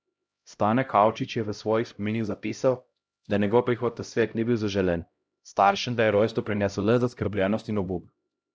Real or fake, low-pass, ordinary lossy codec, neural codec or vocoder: fake; none; none; codec, 16 kHz, 0.5 kbps, X-Codec, HuBERT features, trained on LibriSpeech